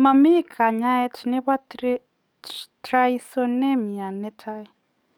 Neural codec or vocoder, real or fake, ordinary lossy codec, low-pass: codec, 44.1 kHz, 7.8 kbps, DAC; fake; none; none